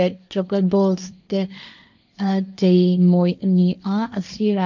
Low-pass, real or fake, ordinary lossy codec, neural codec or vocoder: 7.2 kHz; fake; none; codec, 16 kHz, 1.1 kbps, Voila-Tokenizer